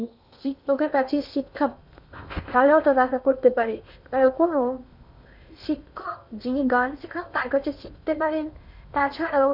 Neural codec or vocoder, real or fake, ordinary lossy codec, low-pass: codec, 16 kHz in and 24 kHz out, 0.8 kbps, FocalCodec, streaming, 65536 codes; fake; none; 5.4 kHz